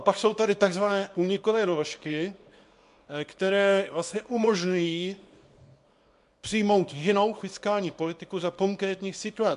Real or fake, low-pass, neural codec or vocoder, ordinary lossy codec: fake; 10.8 kHz; codec, 24 kHz, 0.9 kbps, WavTokenizer, small release; MP3, 64 kbps